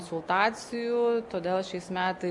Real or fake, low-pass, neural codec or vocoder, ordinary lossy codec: real; 10.8 kHz; none; MP3, 64 kbps